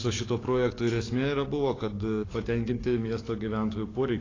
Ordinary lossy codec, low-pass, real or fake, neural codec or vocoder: AAC, 32 kbps; 7.2 kHz; fake; codec, 44.1 kHz, 7.8 kbps, DAC